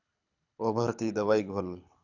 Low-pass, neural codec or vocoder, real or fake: 7.2 kHz; codec, 24 kHz, 6 kbps, HILCodec; fake